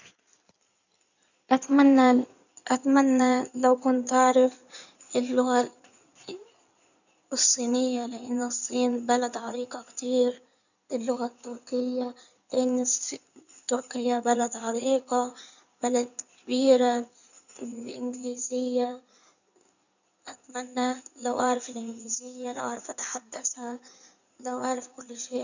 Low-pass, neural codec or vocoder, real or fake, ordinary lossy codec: 7.2 kHz; codec, 16 kHz in and 24 kHz out, 2.2 kbps, FireRedTTS-2 codec; fake; none